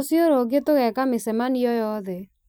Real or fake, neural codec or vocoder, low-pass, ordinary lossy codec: fake; vocoder, 44.1 kHz, 128 mel bands every 256 samples, BigVGAN v2; none; none